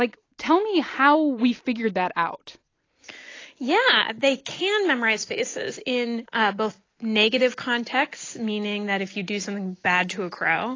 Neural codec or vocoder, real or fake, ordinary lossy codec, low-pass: none; real; AAC, 32 kbps; 7.2 kHz